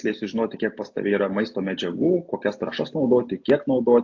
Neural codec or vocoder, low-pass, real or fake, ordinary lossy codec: none; 7.2 kHz; real; AAC, 48 kbps